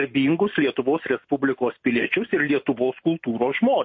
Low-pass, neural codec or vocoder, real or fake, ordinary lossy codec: 7.2 kHz; none; real; MP3, 32 kbps